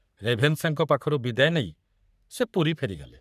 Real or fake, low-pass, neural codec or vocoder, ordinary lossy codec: fake; 14.4 kHz; codec, 44.1 kHz, 3.4 kbps, Pupu-Codec; none